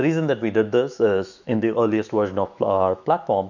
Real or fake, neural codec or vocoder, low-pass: fake; autoencoder, 48 kHz, 128 numbers a frame, DAC-VAE, trained on Japanese speech; 7.2 kHz